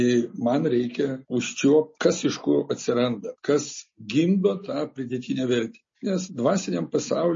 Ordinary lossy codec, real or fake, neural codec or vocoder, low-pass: MP3, 32 kbps; real; none; 7.2 kHz